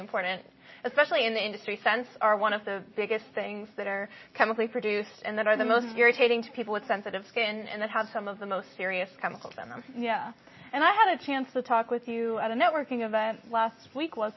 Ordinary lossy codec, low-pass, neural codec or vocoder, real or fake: MP3, 24 kbps; 7.2 kHz; none; real